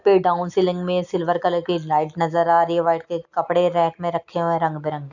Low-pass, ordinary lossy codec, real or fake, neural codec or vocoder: 7.2 kHz; none; real; none